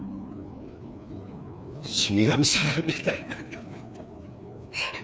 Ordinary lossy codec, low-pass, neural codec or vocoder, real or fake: none; none; codec, 16 kHz, 2 kbps, FreqCodec, larger model; fake